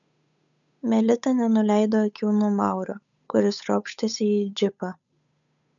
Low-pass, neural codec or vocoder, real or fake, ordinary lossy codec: 7.2 kHz; codec, 16 kHz, 8 kbps, FunCodec, trained on Chinese and English, 25 frames a second; fake; MP3, 96 kbps